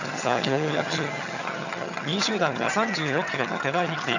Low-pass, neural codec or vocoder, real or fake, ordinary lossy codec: 7.2 kHz; vocoder, 22.05 kHz, 80 mel bands, HiFi-GAN; fake; none